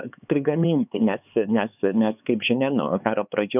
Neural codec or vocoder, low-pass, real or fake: codec, 16 kHz, 4 kbps, X-Codec, HuBERT features, trained on LibriSpeech; 3.6 kHz; fake